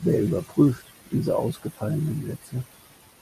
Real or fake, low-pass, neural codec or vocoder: real; 14.4 kHz; none